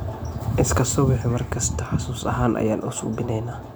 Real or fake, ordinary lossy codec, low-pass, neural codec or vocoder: real; none; none; none